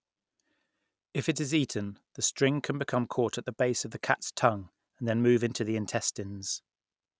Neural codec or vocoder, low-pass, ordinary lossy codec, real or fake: none; none; none; real